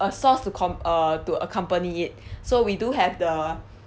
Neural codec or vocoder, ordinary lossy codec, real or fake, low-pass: none; none; real; none